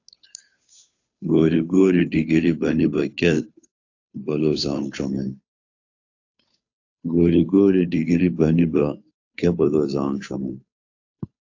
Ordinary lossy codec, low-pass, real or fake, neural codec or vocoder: AAC, 48 kbps; 7.2 kHz; fake; codec, 16 kHz, 2 kbps, FunCodec, trained on Chinese and English, 25 frames a second